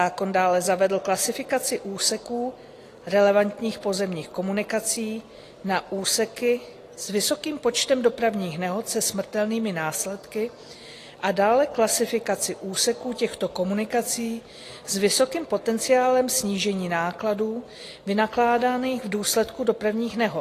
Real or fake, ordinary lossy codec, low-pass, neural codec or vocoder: real; AAC, 48 kbps; 14.4 kHz; none